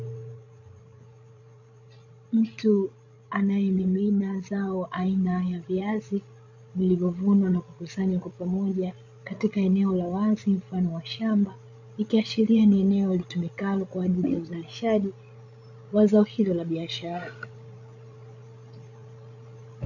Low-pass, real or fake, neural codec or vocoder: 7.2 kHz; fake; codec, 16 kHz, 16 kbps, FreqCodec, larger model